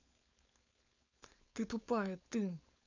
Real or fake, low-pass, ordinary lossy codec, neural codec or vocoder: fake; 7.2 kHz; none; codec, 16 kHz, 4.8 kbps, FACodec